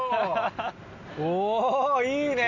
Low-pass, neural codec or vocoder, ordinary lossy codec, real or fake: 7.2 kHz; none; none; real